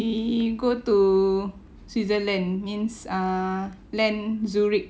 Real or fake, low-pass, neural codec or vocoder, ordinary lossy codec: real; none; none; none